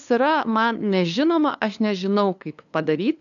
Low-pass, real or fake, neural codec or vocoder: 7.2 kHz; fake; codec, 16 kHz, 2 kbps, FunCodec, trained on LibriTTS, 25 frames a second